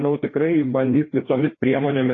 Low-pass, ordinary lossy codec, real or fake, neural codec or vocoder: 7.2 kHz; AAC, 32 kbps; fake; codec, 16 kHz, 2 kbps, FreqCodec, larger model